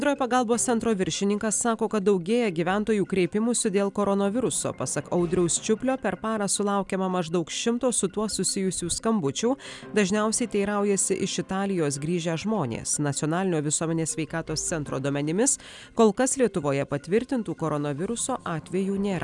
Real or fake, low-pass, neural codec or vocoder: real; 10.8 kHz; none